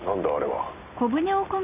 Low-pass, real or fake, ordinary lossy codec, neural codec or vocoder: 3.6 kHz; real; none; none